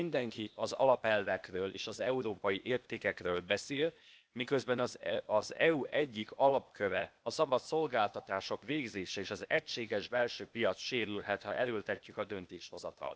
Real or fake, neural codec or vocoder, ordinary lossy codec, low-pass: fake; codec, 16 kHz, 0.8 kbps, ZipCodec; none; none